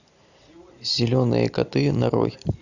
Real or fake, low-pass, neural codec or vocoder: real; 7.2 kHz; none